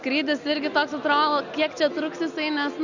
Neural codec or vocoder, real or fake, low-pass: none; real; 7.2 kHz